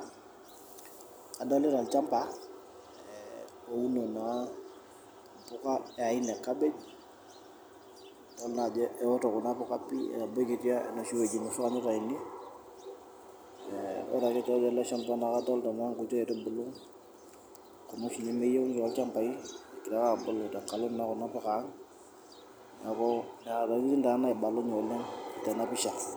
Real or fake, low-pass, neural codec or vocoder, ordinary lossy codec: real; none; none; none